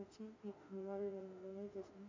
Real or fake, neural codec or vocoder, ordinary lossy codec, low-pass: fake; autoencoder, 48 kHz, 32 numbers a frame, DAC-VAE, trained on Japanese speech; MP3, 48 kbps; 7.2 kHz